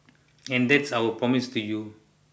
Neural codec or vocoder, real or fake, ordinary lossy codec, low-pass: none; real; none; none